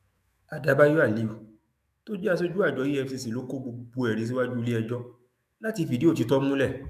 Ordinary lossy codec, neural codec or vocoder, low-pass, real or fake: none; autoencoder, 48 kHz, 128 numbers a frame, DAC-VAE, trained on Japanese speech; 14.4 kHz; fake